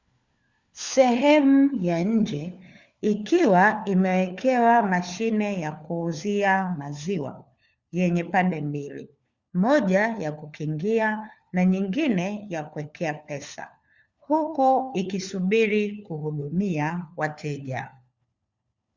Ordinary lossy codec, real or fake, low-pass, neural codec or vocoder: Opus, 64 kbps; fake; 7.2 kHz; codec, 16 kHz, 4 kbps, FunCodec, trained on LibriTTS, 50 frames a second